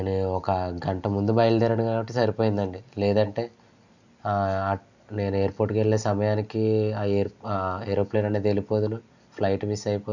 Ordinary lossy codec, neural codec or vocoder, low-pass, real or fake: none; none; 7.2 kHz; real